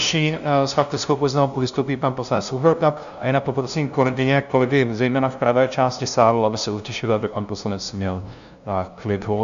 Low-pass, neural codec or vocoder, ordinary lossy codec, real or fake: 7.2 kHz; codec, 16 kHz, 0.5 kbps, FunCodec, trained on LibriTTS, 25 frames a second; AAC, 96 kbps; fake